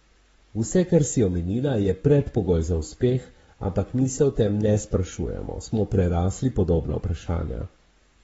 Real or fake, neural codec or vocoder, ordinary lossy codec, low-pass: fake; codec, 44.1 kHz, 7.8 kbps, Pupu-Codec; AAC, 24 kbps; 19.8 kHz